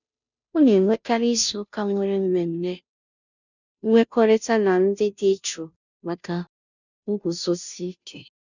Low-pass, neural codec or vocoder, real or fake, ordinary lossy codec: 7.2 kHz; codec, 16 kHz, 0.5 kbps, FunCodec, trained on Chinese and English, 25 frames a second; fake; none